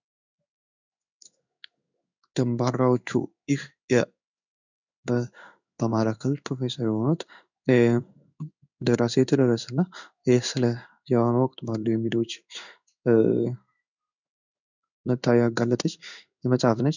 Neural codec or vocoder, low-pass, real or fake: codec, 16 kHz in and 24 kHz out, 1 kbps, XY-Tokenizer; 7.2 kHz; fake